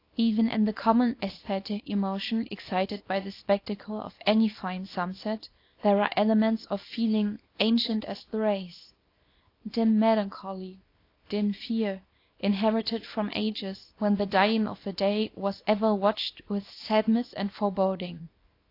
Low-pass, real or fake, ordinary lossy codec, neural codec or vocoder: 5.4 kHz; fake; AAC, 32 kbps; codec, 24 kHz, 0.9 kbps, WavTokenizer, small release